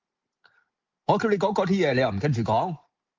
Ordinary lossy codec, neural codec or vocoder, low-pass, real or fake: Opus, 24 kbps; none; 7.2 kHz; real